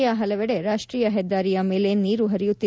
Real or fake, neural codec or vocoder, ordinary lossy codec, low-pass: real; none; none; none